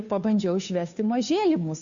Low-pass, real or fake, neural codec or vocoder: 7.2 kHz; fake; codec, 16 kHz, 2 kbps, FunCodec, trained on Chinese and English, 25 frames a second